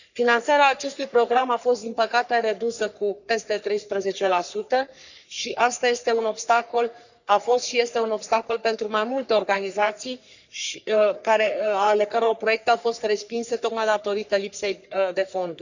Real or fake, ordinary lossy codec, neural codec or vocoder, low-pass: fake; none; codec, 44.1 kHz, 3.4 kbps, Pupu-Codec; 7.2 kHz